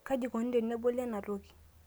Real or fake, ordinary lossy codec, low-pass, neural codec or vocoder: real; none; none; none